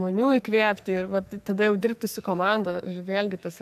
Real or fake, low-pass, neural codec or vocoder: fake; 14.4 kHz; codec, 44.1 kHz, 2.6 kbps, SNAC